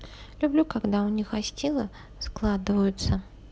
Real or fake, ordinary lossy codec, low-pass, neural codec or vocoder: real; none; none; none